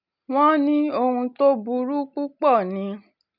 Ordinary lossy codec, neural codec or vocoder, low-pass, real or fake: none; none; 5.4 kHz; real